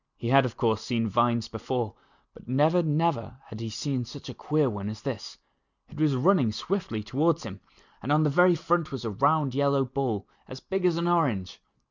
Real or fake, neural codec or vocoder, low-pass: real; none; 7.2 kHz